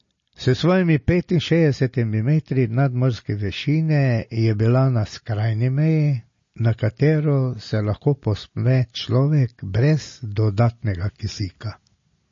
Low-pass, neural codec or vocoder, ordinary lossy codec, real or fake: 7.2 kHz; none; MP3, 32 kbps; real